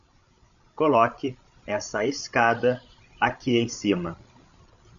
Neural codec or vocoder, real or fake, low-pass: codec, 16 kHz, 16 kbps, FreqCodec, larger model; fake; 7.2 kHz